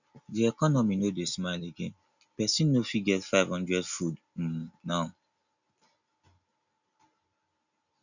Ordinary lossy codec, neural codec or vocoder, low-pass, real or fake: none; none; 7.2 kHz; real